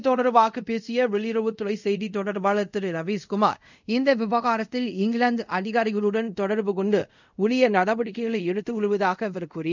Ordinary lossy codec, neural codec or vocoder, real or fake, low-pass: none; codec, 24 kHz, 0.5 kbps, DualCodec; fake; 7.2 kHz